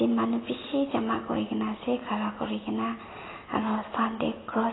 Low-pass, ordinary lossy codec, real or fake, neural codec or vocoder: 7.2 kHz; AAC, 16 kbps; real; none